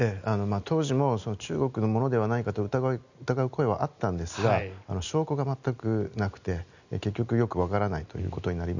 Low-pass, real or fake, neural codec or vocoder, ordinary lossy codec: 7.2 kHz; real; none; none